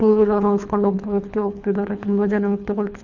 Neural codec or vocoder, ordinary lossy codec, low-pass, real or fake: codec, 24 kHz, 3 kbps, HILCodec; none; 7.2 kHz; fake